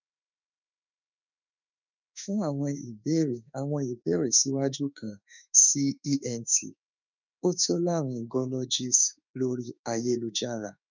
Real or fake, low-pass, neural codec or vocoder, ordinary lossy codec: fake; 7.2 kHz; autoencoder, 48 kHz, 32 numbers a frame, DAC-VAE, trained on Japanese speech; none